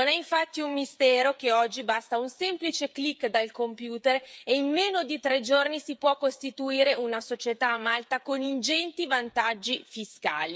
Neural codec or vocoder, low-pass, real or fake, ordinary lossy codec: codec, 16 kHz, 8 kbps, FreqCodec, smaller model; none; fake; none